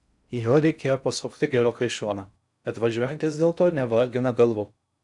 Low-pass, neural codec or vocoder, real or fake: 10.8 kHz; codec, 16 kHz in and 24 kHz out, 0.6 kbps, FocalCodec, streaming, 4096 codes; fake